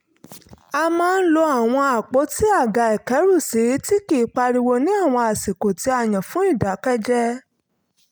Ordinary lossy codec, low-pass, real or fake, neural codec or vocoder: none; none; real; none